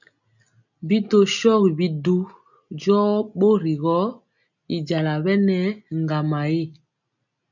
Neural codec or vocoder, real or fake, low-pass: none; real; 7.2 kHz